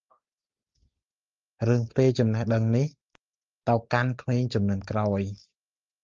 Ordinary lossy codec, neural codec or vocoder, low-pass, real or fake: Opus, 32 kbps; none; 7.2 kHz; real